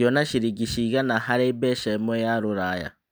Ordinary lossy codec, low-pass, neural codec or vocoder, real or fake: none; none; none; real